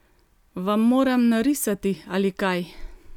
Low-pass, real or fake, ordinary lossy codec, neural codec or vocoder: 19.8 kHz; real; none; none